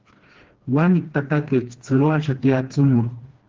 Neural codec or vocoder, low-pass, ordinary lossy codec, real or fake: codec, 16 kHz, 2 kbps, FreqCodec, smaller model; 7.2 kHz; Opus, 16 kbps; fake